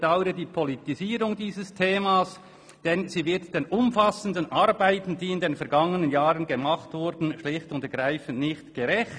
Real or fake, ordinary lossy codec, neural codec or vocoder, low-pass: real; none; none; 9.9 kHz